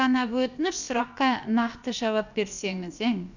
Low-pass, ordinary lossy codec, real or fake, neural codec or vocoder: 7.2 kHz; none; fake; codec, 16 kHz, about 1 kbps, DyCAST, with the encoder's durations